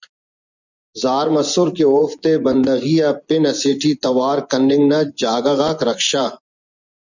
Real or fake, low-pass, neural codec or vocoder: fake; 7.2 kHz; vocoder, 24 kHz, 100 mel bands, Vocos